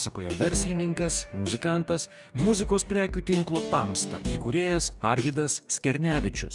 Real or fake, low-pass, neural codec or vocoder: fake; 10.8 kHz; codec, 44.1 kHz, 2.6 kbps, DAC